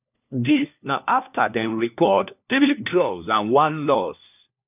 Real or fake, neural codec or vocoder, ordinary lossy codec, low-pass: fake; codec, 16 kHz, 1 kbps, FunCodec, trained on LibriTTS, 50 frames a second; none; 3.6 kHz